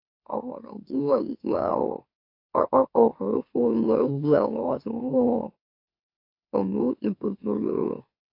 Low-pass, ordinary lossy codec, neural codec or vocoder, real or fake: 5.4 kHz; AAC, 32 kbps; autoencoder, 44.1 kHz, a latent of 192 numbers a frame, MeloTTS; fake